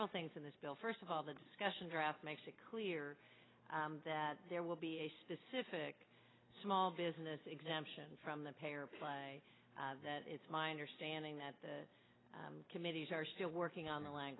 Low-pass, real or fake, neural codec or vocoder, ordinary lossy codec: 7.2 kHz; real; none; AAC, 16 kbps